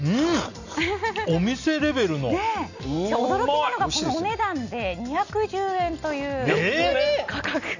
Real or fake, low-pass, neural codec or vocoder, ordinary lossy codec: real; 7.2 kHz; none; none